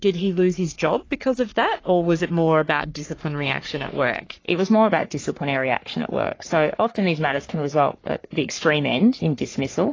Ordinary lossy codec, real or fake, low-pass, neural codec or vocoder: AAC, 32 kbps; fake; 7.2 kHz; codec, 44.1 kHz, 3.4 kbps, Pupu-Codec